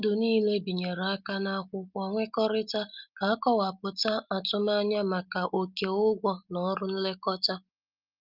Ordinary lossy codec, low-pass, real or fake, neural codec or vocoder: Opus, 24 kbps; 5.4 kHz; real; none